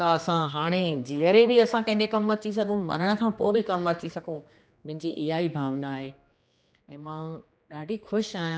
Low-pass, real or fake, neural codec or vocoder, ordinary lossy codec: none; fake; codec, 16 kHz, 2 kbps, X-Codec, HuBERT features, trained on general audio; none